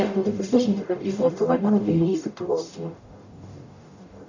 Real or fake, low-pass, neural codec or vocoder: fake; 7.2 kHz; codec, 44.1 kHz, 0.9 kbps, DAC